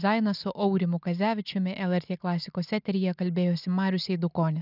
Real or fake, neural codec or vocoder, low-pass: real; none; 5.4 kHz